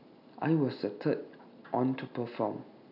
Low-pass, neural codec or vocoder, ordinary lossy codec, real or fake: 5.4 kHz; none; none; real